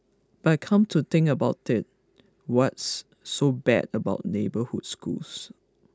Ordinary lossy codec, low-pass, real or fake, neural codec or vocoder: none; none; real; none